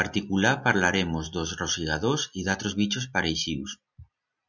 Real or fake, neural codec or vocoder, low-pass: real; none; 7.2 kHz